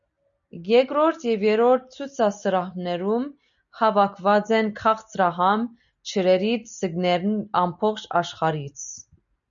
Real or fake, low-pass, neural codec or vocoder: real; 7.2 kHz; none